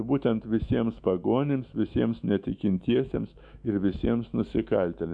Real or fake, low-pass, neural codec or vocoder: fake; 9.9 kHz; autoencoder, 48 kHz, 128 numbers a frame, DAC-VAE, trained on Japanese speech